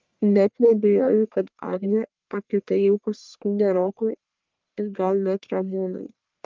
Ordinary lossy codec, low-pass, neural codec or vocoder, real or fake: Opus, 32 kbps; 7.2 kHz; codec, 44.1 kHz, 1.7 kbps, Pupu-Codec; fake